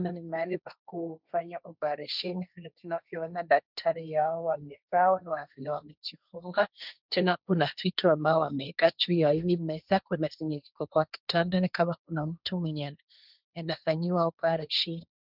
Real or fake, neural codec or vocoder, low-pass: fake; codec, 16 kHz, 1.1 kbps, Voila-Tokenizer; 5.4 kHz